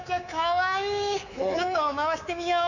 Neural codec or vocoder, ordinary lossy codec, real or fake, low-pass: codec, 24 kHz, 3.1 kbps, DualCodec; none; fake; 7.2 kHz